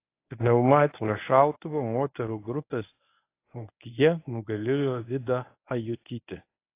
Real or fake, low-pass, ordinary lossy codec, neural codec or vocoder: fake; 3.6 kHz; AAC, 24 kbps; codec, 24 kHz, 0.9 kbps, WavTokenizer, medium speech release version 1